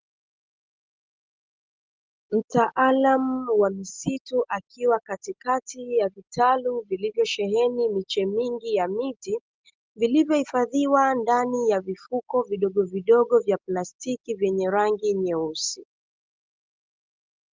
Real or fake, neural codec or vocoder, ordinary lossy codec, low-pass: real; none; Opus, 32 kbps; 7.2 kHz